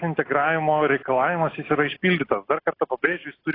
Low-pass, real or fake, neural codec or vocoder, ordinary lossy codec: 5.4 kHz; real; none; AAC, 24 kbps